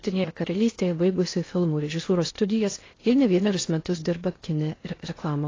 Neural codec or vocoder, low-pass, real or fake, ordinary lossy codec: codec, 16 kHz in and 24 kHz out, 0.6 kbps, FocalCodec, streaming, 2048 codes; 7.2 kHz; fake; AAC, 32 kbps